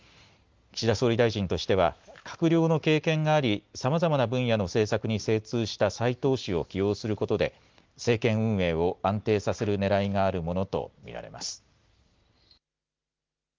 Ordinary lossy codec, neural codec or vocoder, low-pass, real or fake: Opus, 32 kbps; none; 7.2 kHz; real